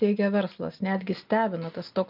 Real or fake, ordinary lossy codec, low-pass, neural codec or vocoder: real; Opus, 24 kbps; 5.4 kHz; none